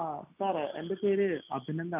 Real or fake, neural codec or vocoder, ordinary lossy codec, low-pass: real; none; none; 3.6 kHz